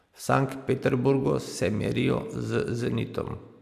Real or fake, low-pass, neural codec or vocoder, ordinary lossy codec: real; 14.4 kHz; none; AAC, 96 kbps